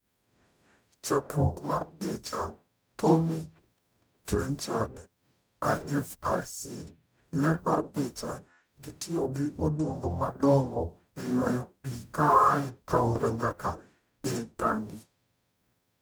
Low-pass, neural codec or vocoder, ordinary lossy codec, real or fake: none; codec, 44.1 kHz, 0.9 kbps, DAC; none; fake